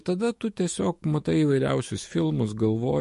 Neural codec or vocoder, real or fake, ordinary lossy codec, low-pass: autoencoder, 48 kHz, 128 numbers a frame, DAC-VAE, trained on Japanese speech; fake; MP3, 48 kbps; 14.4 kHz